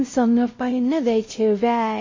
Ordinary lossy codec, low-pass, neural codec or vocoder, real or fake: MP3, 32 kbps; 7.2 kHz; codec, 16 kHz, 0.5 kbps, X-Codec, WavLM features, trained on Multilingual LibriSpeech; fake